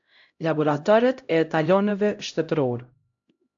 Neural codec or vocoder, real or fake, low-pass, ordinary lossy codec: codec, 16 kHz, 0.5 kbps, X-Codec, HuBERT features, trained on LibriSpeech; fake; 7.2 kHz; AAC, 64 kbps